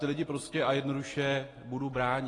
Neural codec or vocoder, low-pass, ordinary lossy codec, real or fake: none; 10.8 kHz; AAC, 32 kbps; real